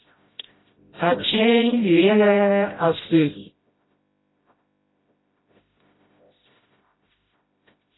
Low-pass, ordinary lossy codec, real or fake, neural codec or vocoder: 7.2 kHz; AAC, 16 kbps; fake; codec, 16 kHz, 0.5 kbps, FreqCodec, smaller model